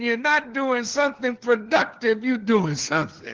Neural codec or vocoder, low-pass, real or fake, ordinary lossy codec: codec, 16 kHz, 4 kbps, FunCodec, trained on LibriTTS, 50 frames a second; 7.2 kHz; fake; Opus, 16 kbps